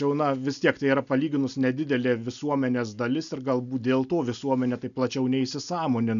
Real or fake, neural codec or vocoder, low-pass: real; none; 7.2 kHz